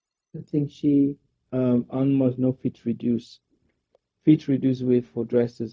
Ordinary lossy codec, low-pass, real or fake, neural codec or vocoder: none; none; fake; codec, 16 kHz, 0.4 kbps, LongCat-Audio-Codec